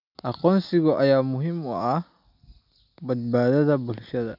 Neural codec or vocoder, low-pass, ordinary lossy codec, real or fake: none; 5.4 kHz; none; real